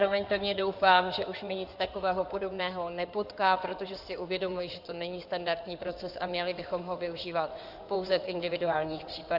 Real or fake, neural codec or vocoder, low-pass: fake; codec, 16 kHz in and 24 kHz out, 2.2 kbps, FireRedTTS-2 codec; 5.4 kHz